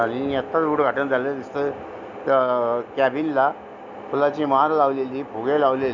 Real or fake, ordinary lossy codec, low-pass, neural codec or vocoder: real; none; 7.2 kHz; none